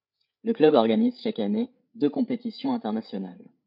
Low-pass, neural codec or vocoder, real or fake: 5.4 kHz; codec, 16 kHz, 8 kbps, FreqCodec, larger model; fake